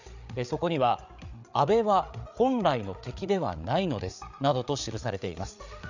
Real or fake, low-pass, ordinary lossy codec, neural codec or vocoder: fake; 7.2 kHz; none; codec, 16 kHz, 8 kbps, FreqCodec, larger model